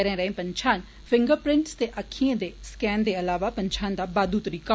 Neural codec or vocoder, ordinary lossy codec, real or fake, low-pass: none; none; real; none